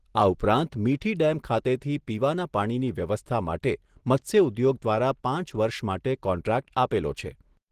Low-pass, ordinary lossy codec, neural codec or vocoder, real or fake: 14.4 kHz; Opus, 16 kbps; none; real